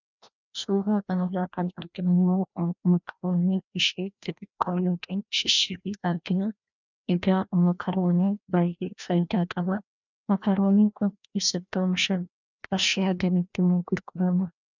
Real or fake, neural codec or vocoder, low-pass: fake; codec, 16 kHz, 1 kbps, FreqCodec, larger model; 7.2 kHz